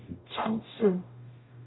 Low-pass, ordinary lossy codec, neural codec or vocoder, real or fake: 7.2 kHz; AAC, 16 kbps; codec, 44.1 kHz, 0.9 kbps, DAC; fake